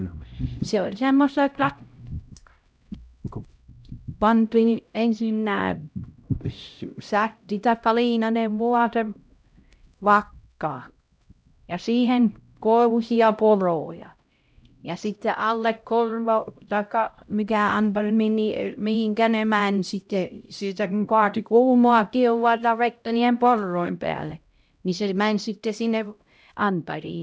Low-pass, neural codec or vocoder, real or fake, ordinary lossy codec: none; codec, 16 kHz, 0.5 kbps, X-Codec, HuBERT features, trained on LibriSpeech; fake; none